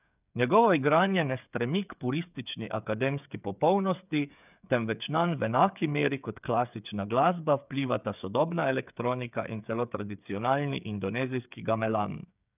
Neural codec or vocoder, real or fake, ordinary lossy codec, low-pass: codec, 16 kHz, 8 kbps, FreqCodec, smaller model; fake; none; 3.6 kHz